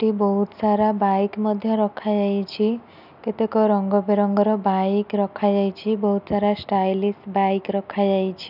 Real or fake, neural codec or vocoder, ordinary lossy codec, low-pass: real; none; none; 5.4 kHz